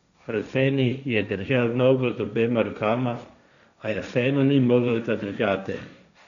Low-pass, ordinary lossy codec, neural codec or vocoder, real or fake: 7.2 kHz; none; codec, 16 kHz, 1.1 kbps, Voila-Tokenizer; fake